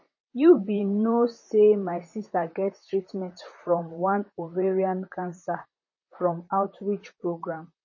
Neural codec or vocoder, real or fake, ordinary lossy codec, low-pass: vocoder, 44.1 kHz, 128 mel bands, Pupu-Vocoder; fake; MP3, 32 kbps; 7.2 kHz